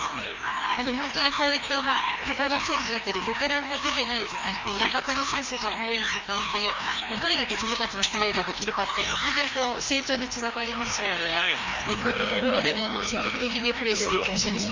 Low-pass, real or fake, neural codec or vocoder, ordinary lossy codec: 7.2 kHz; fake; codec, 16 kHz, 1 kbps, FreqCodec, larger model; MP3, 64 kbps